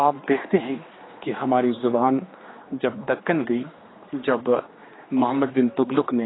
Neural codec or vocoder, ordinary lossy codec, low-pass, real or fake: codec, 16 kHz, 2 kbps, X-Codec, HuBERT features, trained on general audio; AAC, 16 kbps; 7.2 kHz; fake